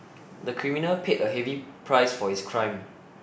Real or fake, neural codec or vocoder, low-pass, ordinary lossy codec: real; none; none; none